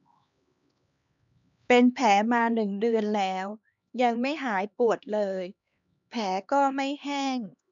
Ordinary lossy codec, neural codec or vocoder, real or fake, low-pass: none; codec, 16 kHz, 2 kbps, X-Codec, HuBERT features, trained on LibriSpeech; fake; 7.2 kHz